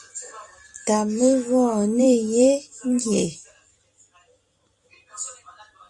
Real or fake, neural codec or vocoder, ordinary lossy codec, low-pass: fake; vocoder, 44.1 kHz, 128 mel bands every 256 samples, BigVGAN v2; Opus, 64 kbps; 10.8 kHz